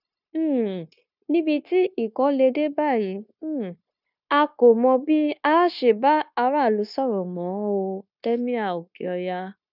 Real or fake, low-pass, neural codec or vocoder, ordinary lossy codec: fake; 5.4 kHz; codec, 16 kHz, 0.9 kbps, LongCat-Audio-Codec; none